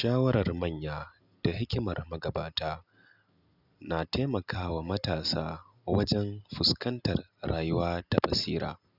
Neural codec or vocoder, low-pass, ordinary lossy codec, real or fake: none; 5.4 kHz; none; real